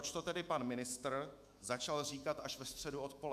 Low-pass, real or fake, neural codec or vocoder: 14.4 kHz; fake; autoencoder, 48 kHz, 128 numbers a frame, DAC-VAE, trained on Japanese speech